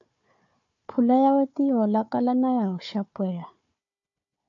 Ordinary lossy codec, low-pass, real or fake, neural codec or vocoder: AAC, 64 kbps; 7.2 kHz; fake; codec, 16 kHz, 4 kbps, FunCodec, trained on Chinese and English, 50 frames a second